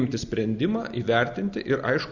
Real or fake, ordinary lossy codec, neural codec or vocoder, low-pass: real; Opus, 64 kbps; none; 7.2 kHz